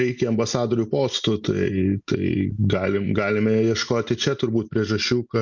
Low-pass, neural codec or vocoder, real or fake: 7.2 kHz; none; real